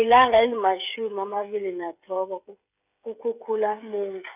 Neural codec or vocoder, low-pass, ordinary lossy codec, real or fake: codec, 16 kHz, 16 kbps, FreqCodec, smaller model; 3.6 kHz; none; fake